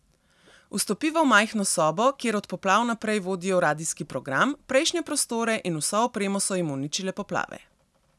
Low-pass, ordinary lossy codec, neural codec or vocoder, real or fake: none; none; none; real